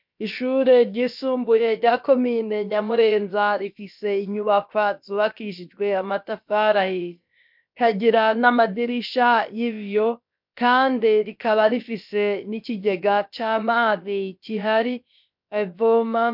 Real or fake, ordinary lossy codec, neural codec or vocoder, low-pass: fake; MP3, 48 kbps; codec, 16 kHz, about 1 kbps, DyCAST, with the encoder's durations; 5.4 kHz